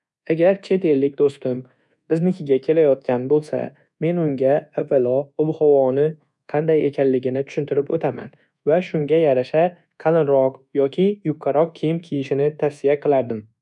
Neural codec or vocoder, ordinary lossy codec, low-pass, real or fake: codec, 24 kHz, 1.2 kbps, DualCodec; none; 10.8 kHz; fake